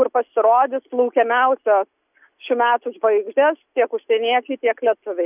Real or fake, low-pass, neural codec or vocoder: real; 3.6 kHz; none